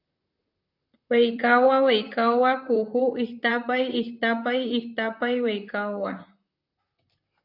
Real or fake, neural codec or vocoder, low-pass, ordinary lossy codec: fake; vocoder, 44.1 kHz, 128 mel bands, Pupu-Vocoder; 5.4 kHz; AAC, 48 kbps